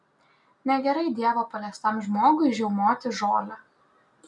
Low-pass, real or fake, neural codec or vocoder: 10.8 kHz; real; none